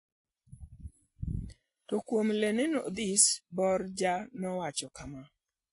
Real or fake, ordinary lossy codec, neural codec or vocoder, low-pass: real; AAC, 48 kbps; none; 10.8 kHz